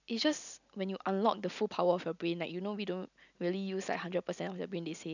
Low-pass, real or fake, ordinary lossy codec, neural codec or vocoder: 7.2 kHz; real; none; none